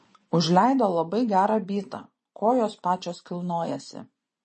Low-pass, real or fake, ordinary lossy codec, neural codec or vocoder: 10.8 kHz; fake; MP3, 32 kbps; vocoder, 24 kHz, 100 mel bands, Vocos